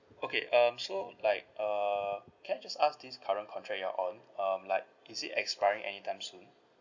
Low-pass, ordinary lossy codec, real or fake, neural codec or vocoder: 7.2 kHz; none; real; none